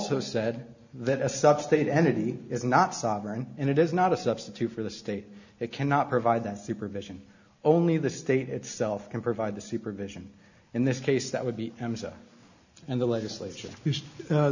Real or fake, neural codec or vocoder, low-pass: real; none; 7.2 kHz